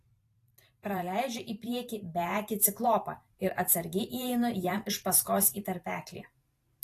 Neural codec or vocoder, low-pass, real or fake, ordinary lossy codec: vocoder, 44.1 kHz, 128 mel bands every 512 samples, BigVGAN v2; 14.4 kHz; fake; AAC, 48 kbps